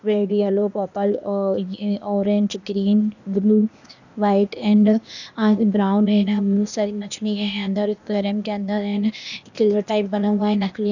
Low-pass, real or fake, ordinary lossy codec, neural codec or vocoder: 7.2 kHz; fake; none; codec, 16 kHz, 0.8 kbps, ZipCodec